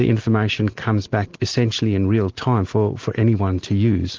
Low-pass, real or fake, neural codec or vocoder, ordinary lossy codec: 7.2 kHz; real; none; Opus, 16 kbps